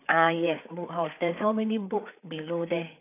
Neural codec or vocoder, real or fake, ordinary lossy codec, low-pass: codec, 16 kHz, 4 kbps, FreqCodec, larger model; fake; AAC, 24 kbps; 3.6 kHz